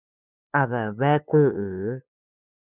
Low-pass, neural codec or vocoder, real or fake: 3.6 kHz; codec, 16 kHz, 8 kbps, FunCodec, trained on LibriTTS, 25 frames a second; fake